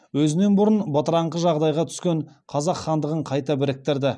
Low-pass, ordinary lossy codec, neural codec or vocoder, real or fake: none; none; none; real